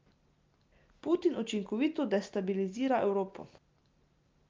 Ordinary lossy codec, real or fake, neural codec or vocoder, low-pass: Opus, 24 kbps; real; none; 7.2 kHz